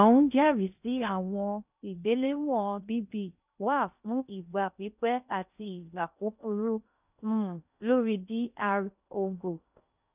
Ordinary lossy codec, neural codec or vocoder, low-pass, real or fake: none; codec, 16 kHz in and 24 kHz out, 0.6 kbps, FocalCodec, streaming, 2048 codes; 3.6 kHz; fake